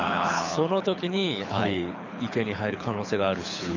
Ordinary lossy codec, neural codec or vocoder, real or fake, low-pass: none; codec, 24 kHz, 6 kbps, HILCodec; fake; 7.2 kHz